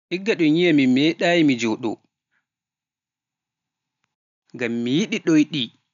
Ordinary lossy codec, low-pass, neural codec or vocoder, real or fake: none; 7.2 kHz; none; real